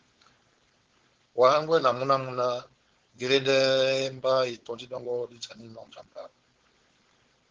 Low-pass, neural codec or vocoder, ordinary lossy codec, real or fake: 7.2 kHz; codec, 16 kHz, 4.8 kbps, FACodec; Opus, 16 kbps; fake